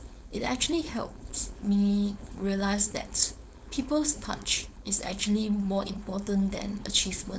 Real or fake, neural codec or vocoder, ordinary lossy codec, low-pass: fake; codec, 16 kHz, 4.8 kbps, FACodec; none; none